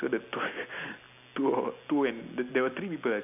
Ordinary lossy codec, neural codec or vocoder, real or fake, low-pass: none; none; real; 3.6 kHz